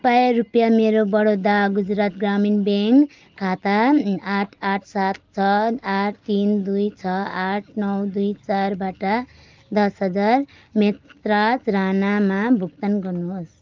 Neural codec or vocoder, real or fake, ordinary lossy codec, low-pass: none; real; Opus, 32 kbps; 7.2 kHz